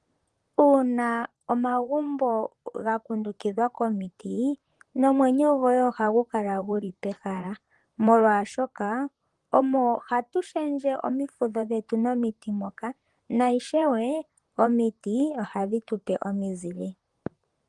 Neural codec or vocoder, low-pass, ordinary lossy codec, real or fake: vocoder, 44.1 kHz, 128 mel bands, Pupu-Vocoder; 10.8 kHz; Opus, 24 kbps; fake